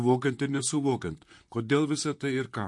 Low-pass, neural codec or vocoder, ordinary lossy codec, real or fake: 10.8 kHz; vocoder, 44.1 kHz, 128 mel bands, Pupu-Vocoder; MP3, 48 kbps; fake